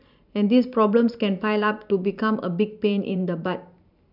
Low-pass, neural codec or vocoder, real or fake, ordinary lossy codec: 5.4 kHz; vocoder, 44.1 kHz, 128 mel bands every 512 samples, BigVGAN v2; fake; none